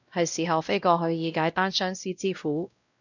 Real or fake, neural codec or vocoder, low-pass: fake; codec, 16 kHz, 0.5 kbps, X-Codec, WavLM features, trained on Multilingual LibriSpeech; 7.2 kHz